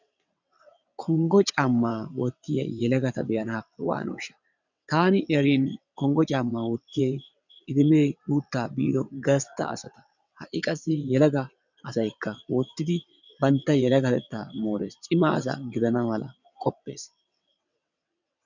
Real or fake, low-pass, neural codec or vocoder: fake; 7.2 kHz; vocoder, 22.05 kHz, 80 mel bands, WaveNeXt